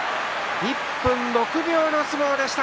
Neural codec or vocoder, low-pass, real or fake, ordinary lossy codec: none; none; real; none